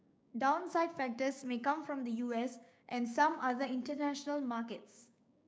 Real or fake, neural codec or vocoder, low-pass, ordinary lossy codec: fake; codec, 16 kHz, 6 kbps, DAC; none; none